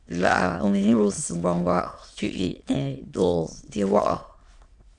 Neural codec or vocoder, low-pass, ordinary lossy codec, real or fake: autoencoder, 22.05 kHz, a latent of 192 numbers a frame, VITS, trained on many speakers; 9.9 kHz; Opus, 64 kbps; fake